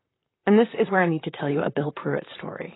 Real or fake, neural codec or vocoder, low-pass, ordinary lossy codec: fake; vocoder, 44.1 kHz, 128 mel bands, Pupu-Vocoder; 7.2 kHz; AAC, 16 kbps